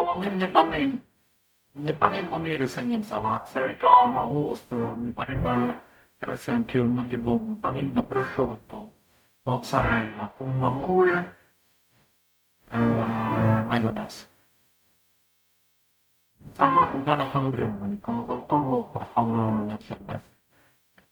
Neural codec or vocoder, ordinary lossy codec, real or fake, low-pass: codec, 44.1 kHz, 0.9 kbps, DAC; none; fake; none